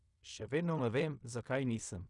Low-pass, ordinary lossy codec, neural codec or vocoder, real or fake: 10.8 kHz; none; codec, 16 kHz in and 24 kHz out, 0.4 kbps, LongCat-Audio-Codec, fine tuned four codebook decoder; fake